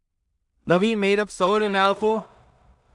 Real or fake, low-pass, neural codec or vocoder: fake; 10.8 kHz; codec, 16 kHz in and 24 kHz out, 0.4 kbps, LongCat-Audio-Codec, two codebook decoder